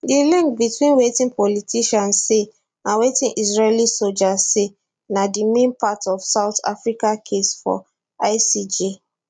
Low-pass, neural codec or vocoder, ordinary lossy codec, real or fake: 9.9 kHz; none; none; real